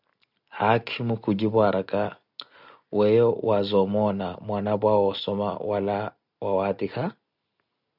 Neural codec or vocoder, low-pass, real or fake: none; 5.4 kHz; real